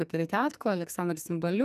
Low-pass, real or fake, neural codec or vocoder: 14.4 kHz; fake; codec, 44.1 kHz, 2.6 kbps, SNAC